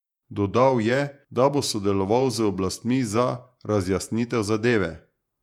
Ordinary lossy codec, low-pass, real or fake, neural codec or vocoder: none; 19.8 kHz; fake; vocoder, 48 kHz, 128 mel bands, Vocos